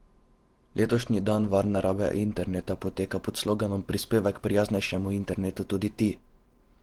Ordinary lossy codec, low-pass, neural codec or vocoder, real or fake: Opus, 16 kbps; 19.8 kHz; vocoder, 48 kHz, 128 mel bands, Vocos; fake